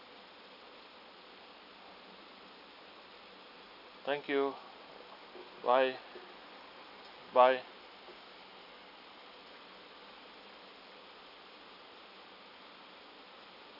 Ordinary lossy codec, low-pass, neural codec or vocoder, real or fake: none; 5.4 kHz; none; real